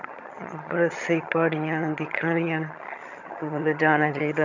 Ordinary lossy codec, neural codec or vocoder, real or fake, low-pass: none; vocoder, 22.05 kHz, 80 mel bands, HiFi-GAN; fake; 7.2 kHz